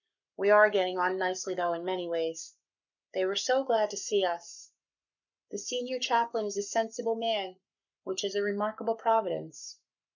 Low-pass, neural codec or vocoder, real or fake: 7.2 kHz; codec, 44.1 kHz, 7.8 kbps, Pupu-Codec; fake